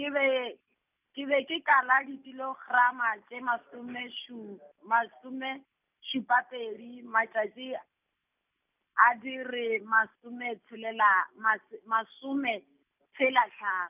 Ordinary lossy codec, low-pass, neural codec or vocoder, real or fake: none; 3.6 kHz; none; real